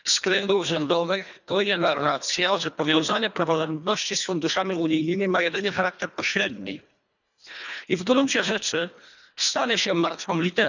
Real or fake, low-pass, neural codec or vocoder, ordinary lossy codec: fake; 7.2 kHz; codec, 24 kHz, 1.5 kbps, HILCodec; none